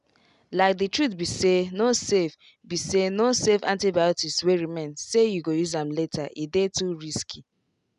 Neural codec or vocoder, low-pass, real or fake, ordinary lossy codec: none; 10.8 kHz; real; none